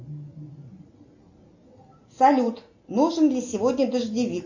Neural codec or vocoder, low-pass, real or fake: none; 7.2 kHz; real